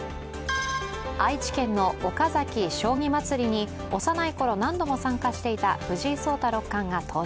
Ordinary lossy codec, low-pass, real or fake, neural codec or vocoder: none; none; real; none